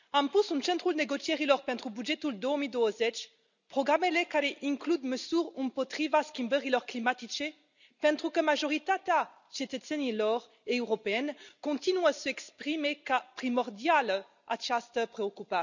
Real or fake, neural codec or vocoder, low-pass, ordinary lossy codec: real; none; 7.2 kHz; none